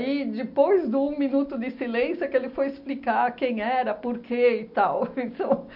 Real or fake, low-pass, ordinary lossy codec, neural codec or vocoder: real; 5.4 kHz; none; none